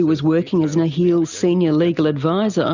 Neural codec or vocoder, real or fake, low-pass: none; real; 7.2 kHz